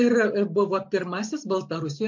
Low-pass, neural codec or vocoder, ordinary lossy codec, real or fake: 7.2 kHz; none; MP3, 48 kbps; real